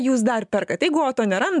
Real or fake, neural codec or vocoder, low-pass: real; none; 10.8 kHz